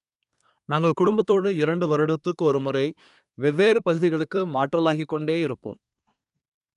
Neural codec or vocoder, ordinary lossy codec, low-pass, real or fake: codec, 24 kHz, 1 kbps, SNAC; none; 10.8 kHz; fake